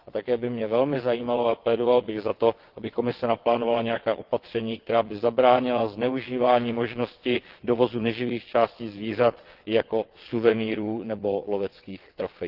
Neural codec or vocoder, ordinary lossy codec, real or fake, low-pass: vocoder, 22.05 kHz, 80 mel bands, WaveNeXt; Opus, 16 kbps; fake; 5.4 kHz